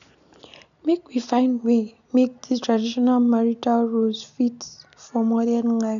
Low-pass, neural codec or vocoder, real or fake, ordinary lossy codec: 7.2 kHz; none; real; none